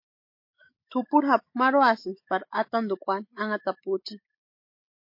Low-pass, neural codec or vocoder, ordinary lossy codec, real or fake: 5.4 kHz; autoencoder, 48 kHz, 128 numbers a frame, DAC-VAE, trained on Japanese speech; MP3, 32 kbps; fake